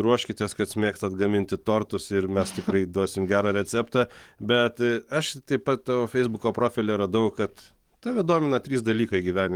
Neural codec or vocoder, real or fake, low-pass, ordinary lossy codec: codec, 44.1 kHz, 7.8 kbps, DAC; fake; 19.8 kHz; Opus, 24 kbps